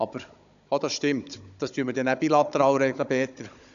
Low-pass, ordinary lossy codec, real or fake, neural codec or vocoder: 7.2 kHz; AAC, 96 kbps; fake; codec, 16 kHz, 16 kbps, FunCodec, trained on Chinese and English, 50 frames a second